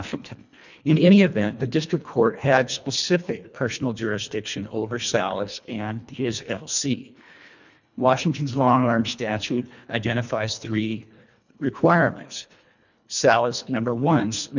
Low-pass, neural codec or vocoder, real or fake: 7.2 kHz; codec, 24 kHz, 1.5 kbps, HILCodec; fake